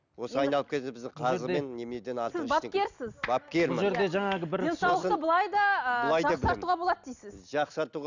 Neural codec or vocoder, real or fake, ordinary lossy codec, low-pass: none; real; none; 7.2 kHz